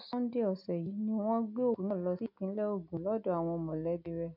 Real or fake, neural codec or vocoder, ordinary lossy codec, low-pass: real; none; none; 5.4 kHz